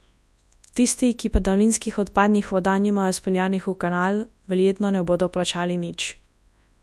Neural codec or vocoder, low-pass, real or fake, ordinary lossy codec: codec, 24 kHz, 0.9 kbps, WavTokenizer, large speech release; none; fake; none